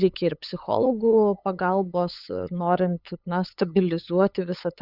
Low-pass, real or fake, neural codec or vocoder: 5.4 kHz; fake; codec, 16 kHz, 8 kbps, FunCodec, trained on LibriTTS, 25 frames a second